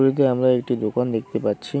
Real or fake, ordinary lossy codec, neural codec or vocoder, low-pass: real; none; none; none